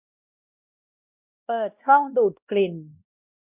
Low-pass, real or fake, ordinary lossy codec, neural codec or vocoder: 3.6 kHz; fake; none; codec, 16 kHz, 1 kbps, X-Codec, WavLM features, trained on Multilingual LibriSpeech